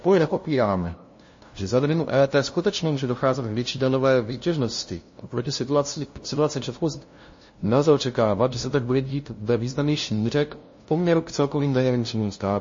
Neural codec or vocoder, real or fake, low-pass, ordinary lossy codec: codec, 16 kHz, 0.5 kbps, FunCodec, trained on LibriTTS, 25 frames a second; fake; 7.2 kHz; MP3, 32 kbps